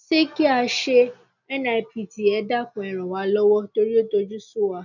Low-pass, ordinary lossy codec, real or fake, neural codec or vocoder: 7.2 kHz; none; real; none